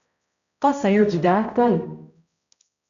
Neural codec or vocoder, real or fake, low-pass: codec, 16 kHz, 0.5 kbps, X-Codec, HuBERT features, trained on balanced general audio; fake; 7.2 kHz